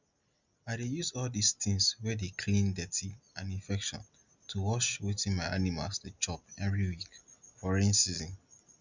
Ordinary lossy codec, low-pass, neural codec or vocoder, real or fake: Opus, 64 kbps; 7.2 kHz; none; real